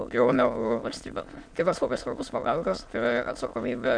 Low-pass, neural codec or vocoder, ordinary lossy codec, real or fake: 9.9 kHz; autoencoder, 22.05 kHz, a latent of 192 numbers a frame, VITS, trained on many speakers; MP3, 64 kbps; fake